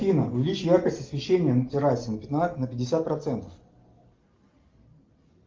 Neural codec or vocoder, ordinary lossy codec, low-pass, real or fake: none; Opus, 32 kbps; 7.2 kHz; real